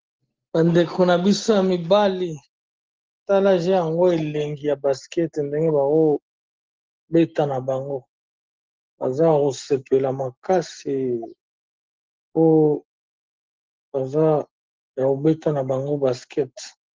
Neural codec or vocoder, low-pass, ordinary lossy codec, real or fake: none; 7.2 kHz; Opus, 16 kbps; real